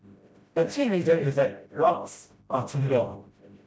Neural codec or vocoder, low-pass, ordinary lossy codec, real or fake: codec, 16 kHz, 0.5 kbps, FreqCodec, smaller model; none; none; fake